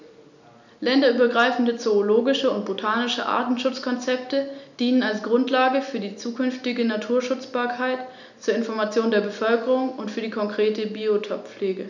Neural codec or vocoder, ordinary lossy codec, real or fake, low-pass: none; none; real; 7.2 kHz